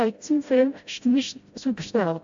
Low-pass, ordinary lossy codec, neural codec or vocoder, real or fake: 7.2 kHz; AAC, 64 kbps; codec, 16 kHz, 0.5 kbps, FreqCodec, smaller model; fake